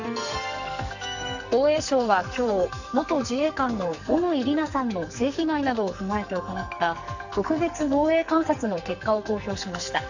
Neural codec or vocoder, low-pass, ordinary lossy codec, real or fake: codec, 44.1 kHz, 2.6 kbps, SNAC; 7.2 kHz; none; fake